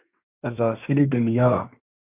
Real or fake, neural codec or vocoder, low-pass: fake; codec, 24 kHz, 1 kbps, SNAC; 3.6 kHz